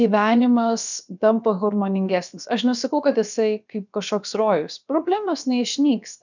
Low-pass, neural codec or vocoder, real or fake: 7.2 kHz; codec, 16 kHz, about 1 kbps, DyCAST, with the encoder's durations; fake